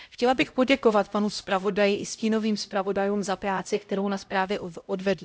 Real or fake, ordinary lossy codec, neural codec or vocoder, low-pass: fake; none; codec, 16 kHz, 0.5 kbps, X-Codec, HuBERT features, trained on LibriSpeech; none